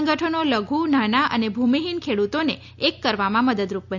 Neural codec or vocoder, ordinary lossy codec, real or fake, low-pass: none; none; real; 7.2 kHz